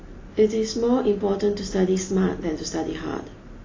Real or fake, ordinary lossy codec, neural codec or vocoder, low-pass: real; AAC, 32 kbps; none; 7.2 kHz